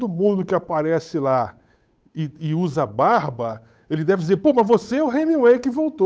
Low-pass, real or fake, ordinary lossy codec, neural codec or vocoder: none; fake; none; codec, 16 kHz, 8 kbps, FunCodec, trained on Chinese and English, 25 frames a second